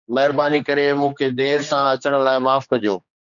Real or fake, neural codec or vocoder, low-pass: fake; codec, 16 kHz, 2 kbps, X-Codec, HuBERT features, trained on general audio; 7.2 kHz